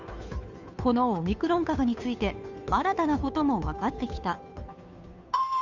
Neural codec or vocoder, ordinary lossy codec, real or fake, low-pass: codec, 16 kHz, 2 kbps, FunCodec, trained on Chinese and English, 25 frames a second; none; fake; 7.2 kHz